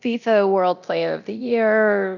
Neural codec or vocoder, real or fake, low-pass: codec, 24 kHz, 0.9 kbps, DualCodec; fake; 7.2 kHz